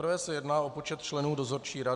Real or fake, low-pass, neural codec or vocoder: real; 10.8 kHz; none